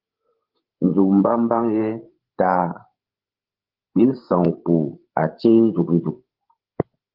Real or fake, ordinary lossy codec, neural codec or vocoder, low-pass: fake; Opus, 16 kbps; codec, 16 kHz, 16 kbps, FreqCodec, larger model; 5.4 kHz